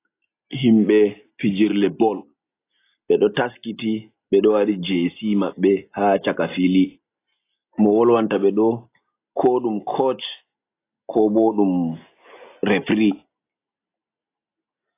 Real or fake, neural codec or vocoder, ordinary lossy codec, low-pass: real; none; AAC, 24 kbps; 3.6 kHz